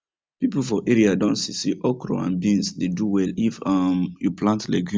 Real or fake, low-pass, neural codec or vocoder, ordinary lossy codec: real; none; none; none